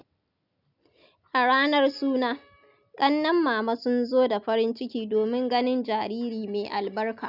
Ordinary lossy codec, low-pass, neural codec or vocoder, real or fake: none; 5.4 kHz; none; real